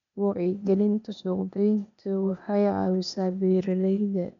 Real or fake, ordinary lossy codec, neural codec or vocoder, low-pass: fake; none; codec, 16 kHz, 0.8 kbps, ZipCodec; 7.2 kHz